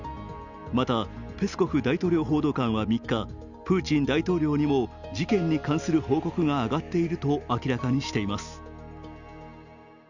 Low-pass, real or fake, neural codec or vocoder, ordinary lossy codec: 7.2 kHz; real; none; none